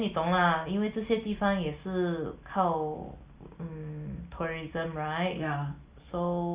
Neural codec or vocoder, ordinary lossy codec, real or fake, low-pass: none; Opus, 64 kbps; real; 3.6 kHz